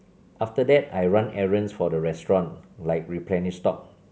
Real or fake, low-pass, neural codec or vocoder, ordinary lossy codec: real; none; none; none